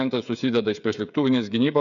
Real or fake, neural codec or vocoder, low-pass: fake; codec, 16 kHz, 8 kbps, FreqCodec, smaller model; 7.2 kHz